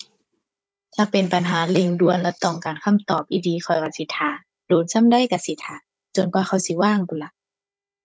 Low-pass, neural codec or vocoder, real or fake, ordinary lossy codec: none; codec, 16 kHz, 16 kbps, FunCodec, trained on Chinese and English, 50 frames a second; fake; none